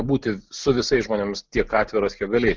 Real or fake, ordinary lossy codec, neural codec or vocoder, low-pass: real; Opus, 24 kbps; none; 7.2 kHz